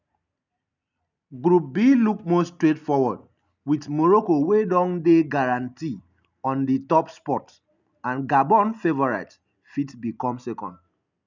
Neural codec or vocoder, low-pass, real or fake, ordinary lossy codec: none; 7.2 kHz; real; none